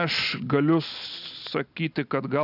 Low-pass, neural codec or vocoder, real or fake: 5.4 kHz; none; real